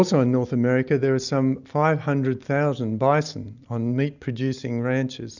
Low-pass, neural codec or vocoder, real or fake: 7.2 kHz; none; real